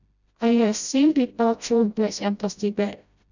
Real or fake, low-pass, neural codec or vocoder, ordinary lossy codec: fake; 7.2 kHz; codec, 16 kHz, 0.5 kbps, FreqCodec, smaller model; none